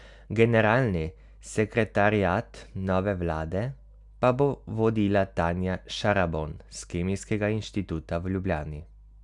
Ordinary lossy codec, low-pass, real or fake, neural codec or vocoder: none; 10.8 kHz; real; none